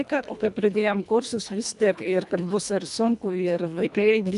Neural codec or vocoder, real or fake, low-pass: codec, 24 kHz, 1.5 kbps, HILCodec; fake; 10.8 kHz